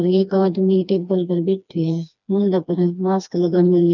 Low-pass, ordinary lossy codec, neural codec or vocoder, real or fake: 7.2 kHz; none; codec, 16 kHz, 2 kbps, FreqCodec, smaller model; fake